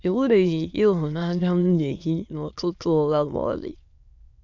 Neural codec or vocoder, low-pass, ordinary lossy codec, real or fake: autoencoder, 22.05 kHz, a latent of 192 numbers a frame, VITS, trained on many speakers; 7.2 kHz; MP3, 64 kbps; fake